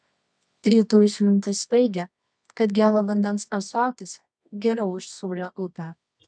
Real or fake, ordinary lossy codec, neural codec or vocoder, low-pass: fake; MP3, 96 kbps; codec, 24 kHz, 0.9 kbps, WavTokenizer, medium music audio release; 9.9 kHz